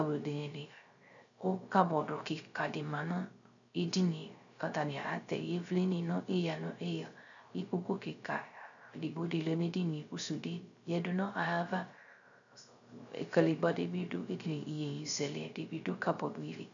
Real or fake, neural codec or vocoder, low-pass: fake; codec, 16 kHz, 0.3 kbps, FocalCodec; 7.2 kHz